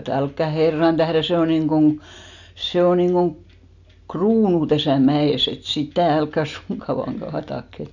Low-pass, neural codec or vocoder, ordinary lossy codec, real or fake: 7.2 kHz; none; none; real